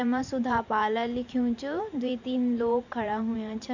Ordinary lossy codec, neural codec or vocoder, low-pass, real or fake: none; vocoder, 44.1 kHz, 128 mel bands every 512 samples, BigVGAN v2; 7.2 kHz; fake